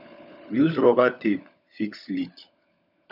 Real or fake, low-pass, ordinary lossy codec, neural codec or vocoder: fake; 5.4 kHz; none; codec, 16 kHz, 16 kbps, FunCodec, trained on LibriTTS, 50 frames a second